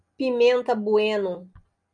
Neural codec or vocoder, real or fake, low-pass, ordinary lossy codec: none; real; 9.9 kHz; MP3, 96 kbps